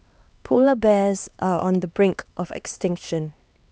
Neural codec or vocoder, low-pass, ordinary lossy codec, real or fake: codec, 16 kHz, 2 kbps, X-Codec, HuBERT features, trained on LibriSpeech; none; none; fake